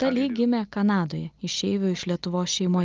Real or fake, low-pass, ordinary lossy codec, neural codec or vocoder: real; 7.2 kHz; Opus, 24 kbps; none